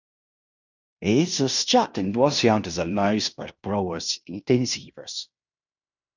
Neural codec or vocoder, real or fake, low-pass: codec, 16 kHz in and 24 kHz out, 0.9 kbps, LongCat-Audio-Codec, fine tuned four codebook decoder; fake; 7.2 kHz